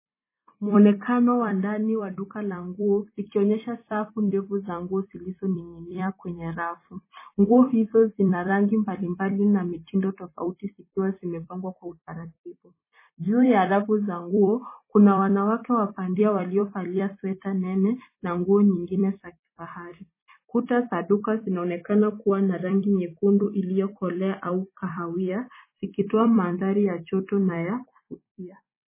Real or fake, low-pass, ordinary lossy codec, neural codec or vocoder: fake; 3.6 kHz; MP3, 16 kbps; vocoder, 44.1 kHz, 128 mel bands every 256 samples, BigVGAN v2